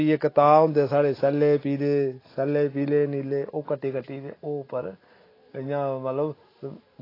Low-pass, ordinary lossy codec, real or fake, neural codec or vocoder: 5.4 kHz; AAC, 24 kbps; real; none